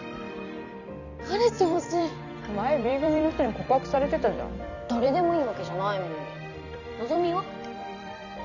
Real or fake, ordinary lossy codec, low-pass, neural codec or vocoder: fake; none; 7.2 kHz; vocoder, 44.1 kHz, 128 mel bands every 256 samples, BigVGAN v2